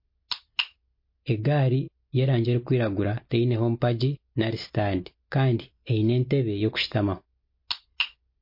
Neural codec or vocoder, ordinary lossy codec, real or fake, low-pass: none; MP3, 32 kbps; real; 5.4 kHz